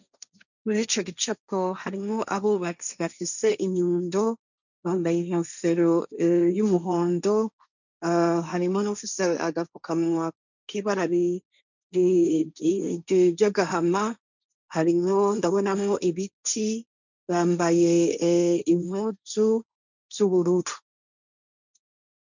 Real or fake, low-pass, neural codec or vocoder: fake; 7.2 kHz; codec, 16 kHz, 1.1 kbps, Voila-Tokenizer